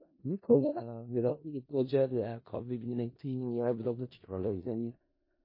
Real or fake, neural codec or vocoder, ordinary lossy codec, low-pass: fake; codec, 16 kHz in and 24 kHz out, 0.4 kbps, LongCat-Audio-Codec, four codebook decoder; MP3, 24 kbps; 5.4 kHz